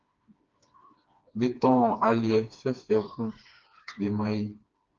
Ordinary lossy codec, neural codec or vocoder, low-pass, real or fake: Opus, 24 kbps; codec, 16 kHz, 2 kbps, FreqCodec, smaller model; 7.2 kHz; fake